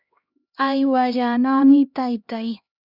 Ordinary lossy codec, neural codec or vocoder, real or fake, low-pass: Opus, 64 kbps; codec, 16 kHz, 1 kbps, X-Codec, HuBERT features, trained on LibriSpeech; fake; 5.4 kHz